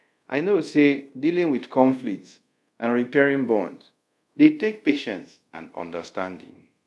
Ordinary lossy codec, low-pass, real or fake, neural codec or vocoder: none; none; fake; codec, 24 kHz, 0.5 kbps, DualCodec